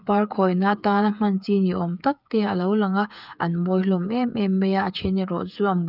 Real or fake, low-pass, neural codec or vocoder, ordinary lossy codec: fake; 5.4 kHz; codec, 16 kHz, 8 kbps, FreqCodec, smaller model; none